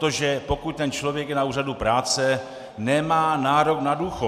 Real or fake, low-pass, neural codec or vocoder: real; 14.4 kHz; none